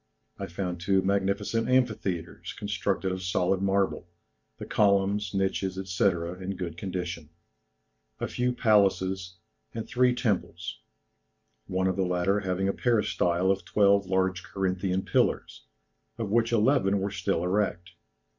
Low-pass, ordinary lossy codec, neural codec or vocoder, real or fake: 7.2 kHz; MP3, 64 kbps; none; real